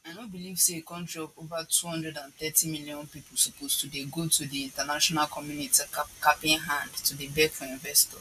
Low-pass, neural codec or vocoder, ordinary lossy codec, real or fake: 14.4 kHz; none; AAC, 96 kbps; real